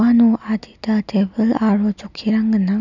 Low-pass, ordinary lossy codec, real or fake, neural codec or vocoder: 7.2 kHz; none; real; none